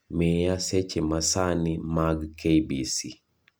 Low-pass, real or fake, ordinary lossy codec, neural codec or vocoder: none; real; none; none